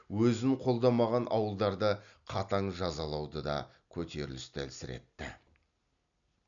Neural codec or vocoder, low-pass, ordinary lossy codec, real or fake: none; 7.2 kHz; none; real